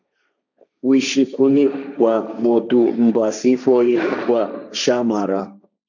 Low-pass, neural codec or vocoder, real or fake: 7.2 kHz; codec, 16 kHz, 1.1 kbps, Voila-Tokenizer; fake